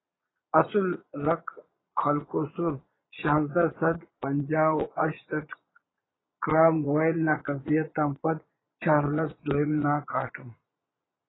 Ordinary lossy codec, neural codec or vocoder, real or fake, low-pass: AAC, 16 kbps; vocoder, 22.05 kHz, 80 mel bands, Vocos; fake; 7.2 kHz